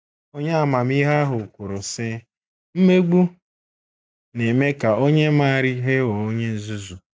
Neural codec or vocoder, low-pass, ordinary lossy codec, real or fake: none; none; none; real